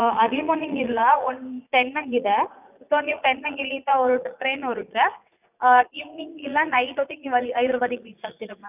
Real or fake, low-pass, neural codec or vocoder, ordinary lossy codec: fake; 3.6 kHz; vocoder, 44.1 kHz, 80 mel bands, Vocos; none